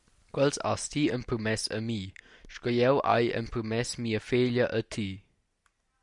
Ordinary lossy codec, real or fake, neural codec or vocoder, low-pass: MP3, 96 kbps; real; none; 10.8 kHz